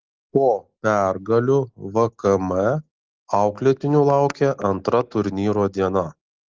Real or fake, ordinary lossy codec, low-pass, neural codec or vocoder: real; Opus, 16 kbps; 7.2 kHz; none